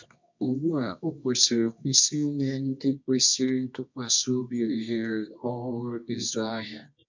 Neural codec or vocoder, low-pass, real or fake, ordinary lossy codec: codec, 24 kHz, 0.9 kbps, WavTokenizer, medium music audio release; 7.2 kHz; fake; MP3, 64 kbps